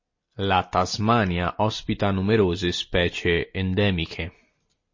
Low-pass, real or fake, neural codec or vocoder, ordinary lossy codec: 7.2 kHz; real; none; MP3, 32 kbps